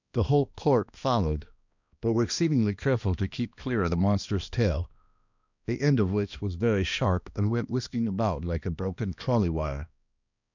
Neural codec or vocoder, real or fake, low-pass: codec, 16 kHz, 1 kbps, X-Codec, HuBERT features, trained on balanced general audio; fake; 7.2 kHz